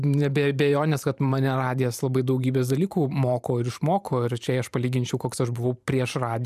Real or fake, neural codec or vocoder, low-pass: fake; vocoder, 44.1 kHz, 128 mel bands every 512 samples, BigVGAN v2; 14.4 kHz